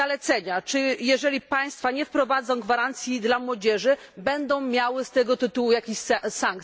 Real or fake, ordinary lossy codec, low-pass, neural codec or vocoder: real; none; none; none